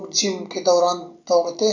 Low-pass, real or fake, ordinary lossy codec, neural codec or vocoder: 7.2 kHz; real; none; none